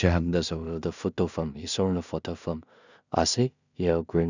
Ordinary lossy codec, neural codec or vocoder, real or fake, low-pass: none; codec, 16 kHz in and 24 kHz out, 0.4 kbps, LongCat-Audio-Codec, two codebook decoder; fake; 7.2 kHz